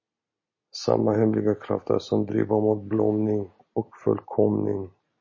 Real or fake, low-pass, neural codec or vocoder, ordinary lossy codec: real; 7.2 kHz; none; MP3, 32 kbps